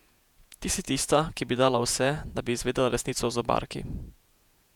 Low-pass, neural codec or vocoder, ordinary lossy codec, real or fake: 19.8 kHz; vocoder, 48 kHz, 128 mel bands, Vocos; none; fake